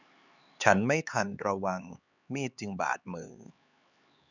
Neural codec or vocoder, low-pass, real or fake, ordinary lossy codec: codec, 16 kHz, 4 kbps, X-Codec, HuBERT features, trained on LibriSpeech; 7.2 kHz; fake; none